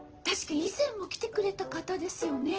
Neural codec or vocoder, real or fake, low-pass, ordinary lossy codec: none; real; 7.2 kHz; Opus, 16 kbps